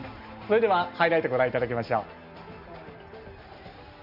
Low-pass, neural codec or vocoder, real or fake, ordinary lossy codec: 5.4 kHz; none; real; none